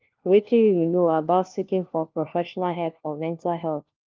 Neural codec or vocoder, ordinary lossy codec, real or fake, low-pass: codec, 16 kHz, 1 kbps, FunCodec, trained on LibriTTS, 50 frames a second; Opus, 32 kbps; fake; 7.2 kHz